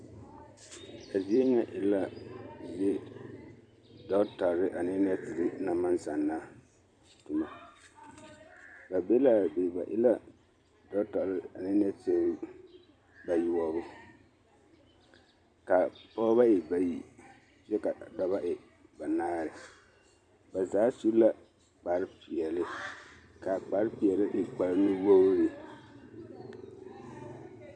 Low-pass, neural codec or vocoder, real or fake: 9.9 kHz; vocoder, 44.1 kHz, 128 mel bands every 256 samples, BigVGAN v2; fake